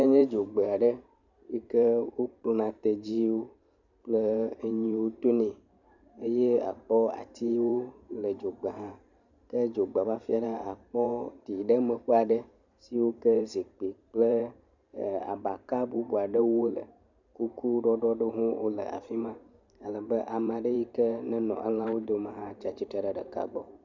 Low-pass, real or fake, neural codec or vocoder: 7.2 kHz; fake; vocoder, 44.1 kHz, 128 mel bands every 512 samples, BigVGAN v2